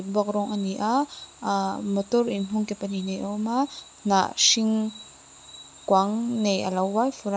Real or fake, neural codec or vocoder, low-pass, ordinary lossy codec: real; none; none; none